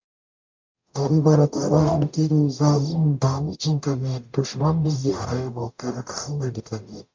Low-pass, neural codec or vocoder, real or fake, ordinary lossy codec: 7.2 kHz; codec, 44.1 kHz, 0.9 kbps, DAC; fake; MP3, 48 kbps